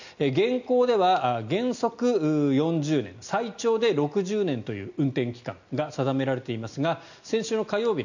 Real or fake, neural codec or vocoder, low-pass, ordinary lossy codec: real; none; 7.2 kHz; none